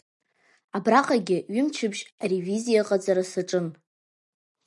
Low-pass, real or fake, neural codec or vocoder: 10.8 kHz; real; none